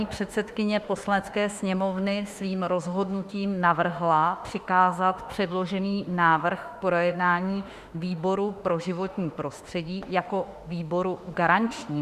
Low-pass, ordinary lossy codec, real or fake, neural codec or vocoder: 14.4 kHz; Opus, 64 kbps; fake; autoencoder, 48 kHz, 32 numbers a frame, DAC-VAE, trained on Japanese speech